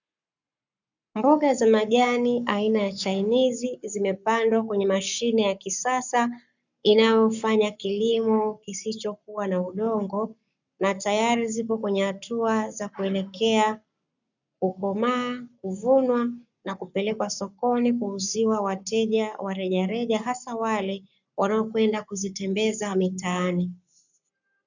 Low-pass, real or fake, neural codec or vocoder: 7.2 kHz; fake; codec, 44.1 kHz, 7.8 kbps, Pupu-Codec